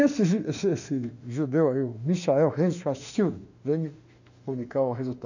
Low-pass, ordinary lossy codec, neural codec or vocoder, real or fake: 7.2 kHz; none; autoencoder, 48 kHz, 32 numbers a frame, DAC-VAE, trained on Japanese speech; fake